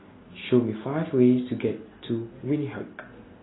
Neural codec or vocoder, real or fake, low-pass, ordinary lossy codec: none; real; 7.2 kHz; AAC, 16 kbps